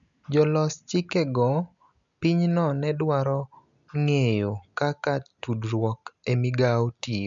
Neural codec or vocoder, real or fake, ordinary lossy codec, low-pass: none; real; MP3, 96 kbps; 7.2 kHz